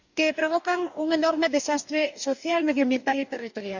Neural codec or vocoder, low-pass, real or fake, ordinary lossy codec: codec, 44.1 kHz, 2.6 kbps, DAC; 7.2 kHz; fake; none